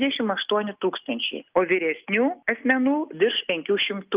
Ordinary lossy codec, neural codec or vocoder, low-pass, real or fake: Opus, 32 kbps; none; 3.6 kHz; real